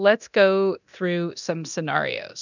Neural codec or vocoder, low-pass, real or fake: codec, 24 kHz, 0.9 kbps, DualCodec; 7.2 kHz; fake